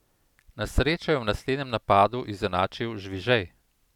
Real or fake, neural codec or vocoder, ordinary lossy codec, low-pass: real; none; none; 19.8 kHz